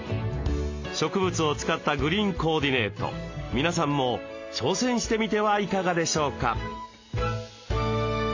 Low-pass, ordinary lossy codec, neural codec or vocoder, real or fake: 7.2 kHz; AAC, 48 kbps; none; real